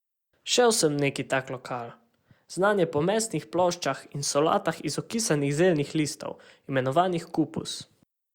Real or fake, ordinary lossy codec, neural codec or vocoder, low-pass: real; Opus, 64 kbps; none; 19.8 kHz